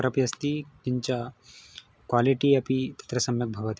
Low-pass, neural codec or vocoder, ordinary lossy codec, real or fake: none; none; none; real